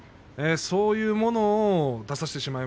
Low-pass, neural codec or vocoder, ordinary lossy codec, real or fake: none; none; none; real